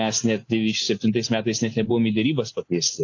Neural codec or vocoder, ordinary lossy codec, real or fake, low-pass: none; AAC, 48 kbps; real; 7.2 kHz